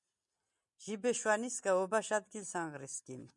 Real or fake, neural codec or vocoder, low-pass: real; none; 10.8 kHz